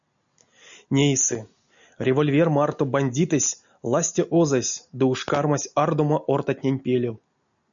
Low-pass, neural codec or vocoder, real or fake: 7.2 kHz; none; real